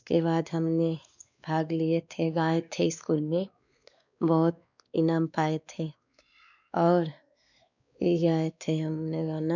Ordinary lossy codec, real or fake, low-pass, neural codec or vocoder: none; fake; 7.2 kHz; codec, 16 kHz, 2 kbps, X-Codec, WavLM features, trained on Multilingual LibriSpeech